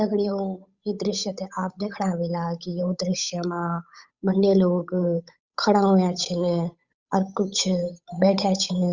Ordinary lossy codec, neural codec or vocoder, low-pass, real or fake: Opus, 64 kbps; codec, 16 kHz, 8 kbps, FunCodec, trained on Chinese and English, 25 frames a second; 7.2 kHz; fake